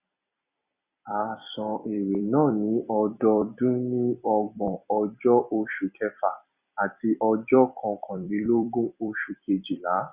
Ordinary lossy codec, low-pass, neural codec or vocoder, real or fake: Opus, 64 kbps; 3.6 kHz; vocoder, 44.1 kHz, 128 mel bands every 256 samples, BigVGAN v2; fake